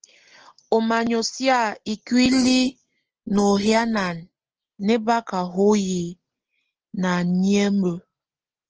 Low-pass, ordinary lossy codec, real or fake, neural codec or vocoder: 7.2 kHz; Opus, 16 kbps; real; none